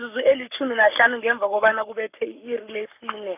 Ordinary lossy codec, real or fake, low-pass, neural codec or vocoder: none; fake; 3.6 kHz; codec, 44.1 kHz, 7.8 kbps, Pupu-Codec